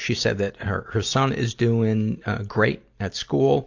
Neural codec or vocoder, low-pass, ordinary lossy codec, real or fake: none; 7.2 kHz; AAC, 48 kbps; real